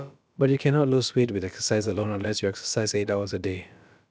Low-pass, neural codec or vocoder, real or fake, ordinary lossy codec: none; codec, 16 kHz, about 1 kbps, DyCAST, with the encoder's durations; fake; none